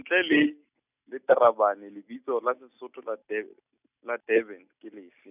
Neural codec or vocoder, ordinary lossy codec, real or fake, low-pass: none; none; real; 3.6 kHz